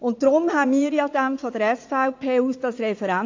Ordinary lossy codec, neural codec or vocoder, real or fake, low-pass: AAC, 48 kbps; vocoder, 44.1 kHz, 80 mel bands, Vocos; fake; 7.2 kHz